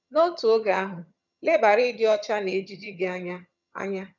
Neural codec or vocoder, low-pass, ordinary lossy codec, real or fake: vocoder, 22.05 kHz, 80 mel bands, HiFi-GAN; 7.2 kHz; none; fake